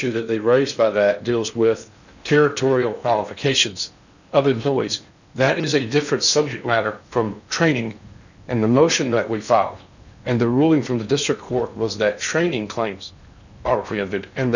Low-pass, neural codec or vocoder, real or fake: 7.2 kHz; codec, 16 kHz in and 24 kHz out, 0.8 kbps, FocalCodec, streaming, 65536 codes; fake